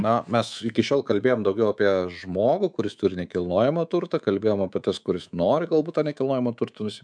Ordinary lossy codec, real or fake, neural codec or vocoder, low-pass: MP3, 96 kbps; fake; codec, 24 kHz, 3.1 kbps, DualCodec; 9.9 kHz